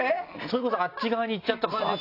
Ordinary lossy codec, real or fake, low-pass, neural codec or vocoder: AAC, 32 kbps; real; 5.4 kHz; none